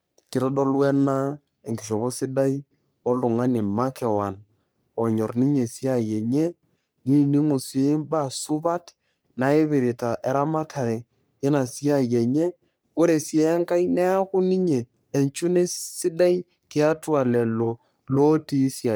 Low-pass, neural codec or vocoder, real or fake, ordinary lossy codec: none; codec, 44.1 kHz, 3.4 kbps, Pupu-Codec; fake; none